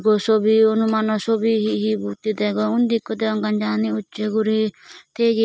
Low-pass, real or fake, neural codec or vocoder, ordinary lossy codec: none; real; none; none